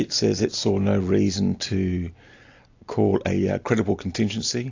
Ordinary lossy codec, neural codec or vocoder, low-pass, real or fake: AAC, 48 kbps; none; 7.2 kHz; real